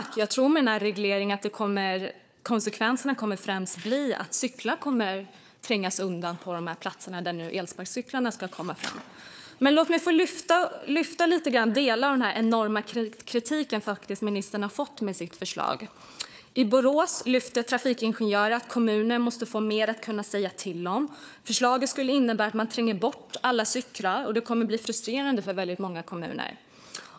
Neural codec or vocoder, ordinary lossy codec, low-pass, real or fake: codec, 16 kHz, 4 kbps, FunCodec, trained on Chinese and English, 50 frames a second; none; none; fake